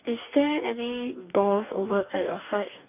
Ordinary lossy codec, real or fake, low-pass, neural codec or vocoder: none; fake; 3.6 kHz; codec, 44.1 kHz, 2.6 kbps, DAC